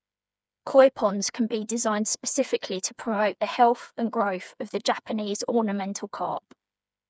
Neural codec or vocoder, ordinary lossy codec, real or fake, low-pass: codec, 16 kHz, 4 kbps, FreqCodec, smaller model; none; fake; none